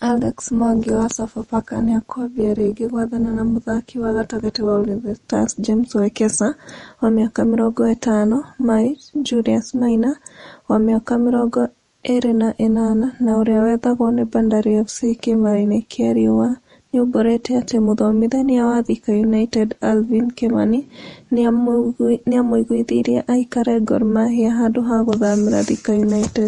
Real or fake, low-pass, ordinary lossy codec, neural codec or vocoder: fake; 19.8 kHz; MP3, 48 kbps; vocoder, 48 kHz, 128 mel bands, Vocos